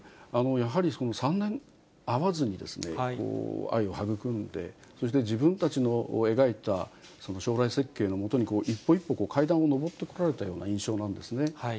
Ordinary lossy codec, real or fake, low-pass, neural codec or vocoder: none; real; none; none